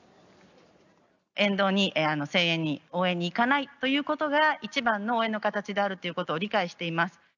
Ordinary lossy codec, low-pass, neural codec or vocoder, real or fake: none; 7.2 kHz; none; real